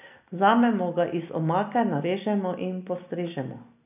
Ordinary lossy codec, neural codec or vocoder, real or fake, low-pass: none; none; real; 3.6 kHz